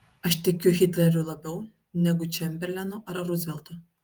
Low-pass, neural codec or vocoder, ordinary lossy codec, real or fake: 19.8 kHz; vocoder, 48 kHz, 128 mel bands, Vocos; Opus, 32 kbps; fake